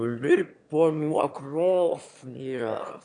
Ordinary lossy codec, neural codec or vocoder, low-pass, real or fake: AAC, 64 kbps; autoencoder, 22.05 kHz, a latent of 192 numbers a frame, VITS, trained on one speaker; 9.9 kHz; fake